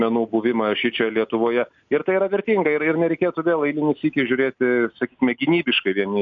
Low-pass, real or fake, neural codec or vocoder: 7.2 kHz; real; none